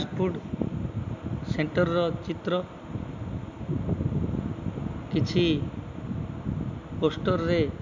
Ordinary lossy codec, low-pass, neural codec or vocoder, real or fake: MP3, 64 kbps; 7.2 kHz; none; real